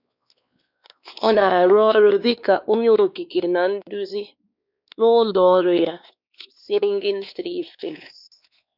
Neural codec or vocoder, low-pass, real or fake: codec, 16 kHz, 2 kbps, X-Codec, WavLM features, trained on Multilingual LibriSpeech; 5.4 kHz; fake